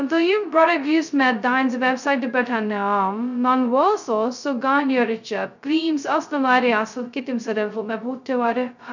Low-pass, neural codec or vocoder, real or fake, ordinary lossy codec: 7.2 kHz; codec, 16 kHz, 0.2 kbps, FocalCodec; fake; none